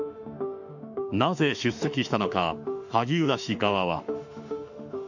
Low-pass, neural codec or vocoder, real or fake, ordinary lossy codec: 7.2 kHz; autoencoder, 48 kHz, 32 numbers a frame, DAC-VAE, trained on Japanese speech; fake; none